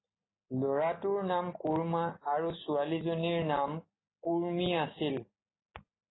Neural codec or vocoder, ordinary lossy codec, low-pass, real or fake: none; AAC, 16 kbps; 7.2 kHz; real